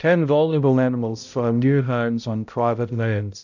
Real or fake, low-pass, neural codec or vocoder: fake; 7.2 kHz; codec, 16 kHz, 0.5 kbps, X-Codec, HuBERT features, trained on balanced general audio